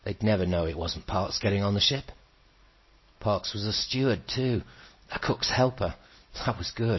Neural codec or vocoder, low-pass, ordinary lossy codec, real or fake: none; 7.2 kHz; MP3, 24 kbps; real